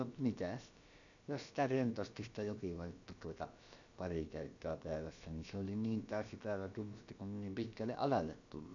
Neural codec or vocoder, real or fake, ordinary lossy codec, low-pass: codec, 16 kHz, about 1 kbps, DyCAST, with the encoder's durations; fake; none; 7.2 kHz